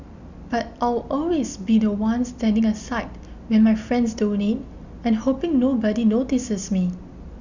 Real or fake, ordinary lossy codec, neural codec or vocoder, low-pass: real; none; none; 7.2 kHz